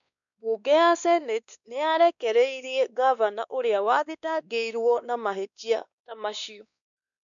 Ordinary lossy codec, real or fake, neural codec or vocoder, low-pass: none; fake; codec, 16 kHz, 2 kbps, X-Codec, WavLM features, trained on Multilingual LibriSpeech; 7.2 kHz